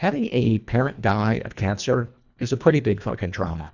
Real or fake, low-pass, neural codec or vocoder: fake; 7.2 kHz; codec, 24 kHz, 1.5 kbps, HILCodec